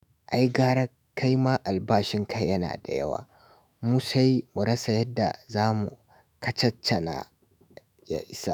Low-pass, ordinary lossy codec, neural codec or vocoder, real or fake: none; none; autoencoder, 48 kHz, 128 numbers a frame, DAC-VAE, trained on Japanese speech; fake